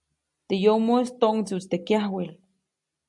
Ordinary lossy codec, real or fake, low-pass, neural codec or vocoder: MP3, 64 kbps; real; 10.8 kHz; none